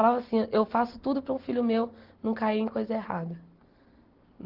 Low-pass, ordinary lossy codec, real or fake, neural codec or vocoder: 5.4 kHz; Opus, 16 kbps; real; none